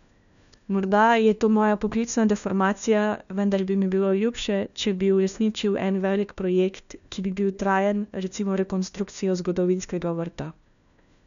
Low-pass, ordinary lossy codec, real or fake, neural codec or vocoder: 7.2 kHz; none; fake; codec, 16 kHz, 1 kbps, FunCodec, trained on LibriTTS, 50 frames a second